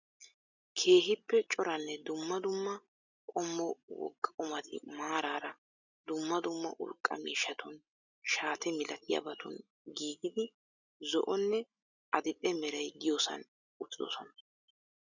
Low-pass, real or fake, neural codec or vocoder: 7.2 kHz; real; none